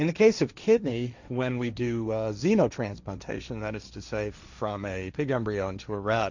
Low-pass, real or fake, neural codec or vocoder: 7.2 kHz; fake; codec, 16 kHz, 1.1 kbps, Voila-Tokenizer